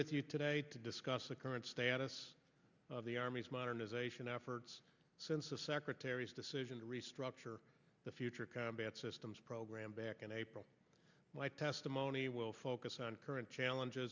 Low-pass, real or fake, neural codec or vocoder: 7.2 kHz; real; none